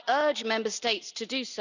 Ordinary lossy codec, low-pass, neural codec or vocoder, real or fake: none; 7.2 kHz; none; real